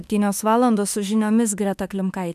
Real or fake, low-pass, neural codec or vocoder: fake; 14.4 kHz; autoencoder, 48 kHz, 32 numbers a frame, DAC-VAE, trained on Japanese speech